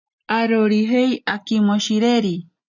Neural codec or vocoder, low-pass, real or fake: none; 7.2 kHz; real